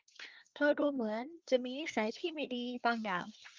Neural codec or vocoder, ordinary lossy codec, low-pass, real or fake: codec, 24 kHz, 1 kbps, SNAC; Opus, 24 kbps; 7.2 kHz; fake